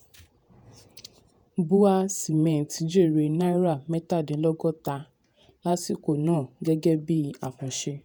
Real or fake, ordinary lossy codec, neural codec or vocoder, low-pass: fake; none; vocoder, 48 kHz, 128 mel bands, Vocos; none